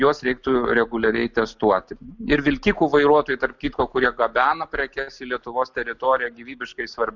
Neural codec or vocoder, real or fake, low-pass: none; real; 7.2 kHz